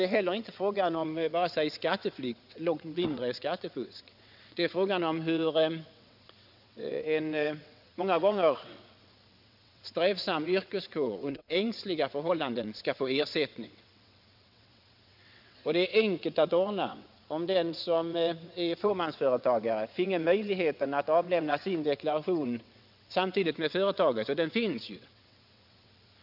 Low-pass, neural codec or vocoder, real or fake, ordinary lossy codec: 5.4 kHz; vocoder, 22.05 kHz, 80 mel bands, WaveNeXt; fake; none